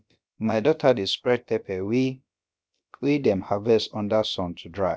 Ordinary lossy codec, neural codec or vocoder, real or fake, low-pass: none; codec, 16 kHz, about 1 kbps, DyCAST, with the encoder's durations; fake; none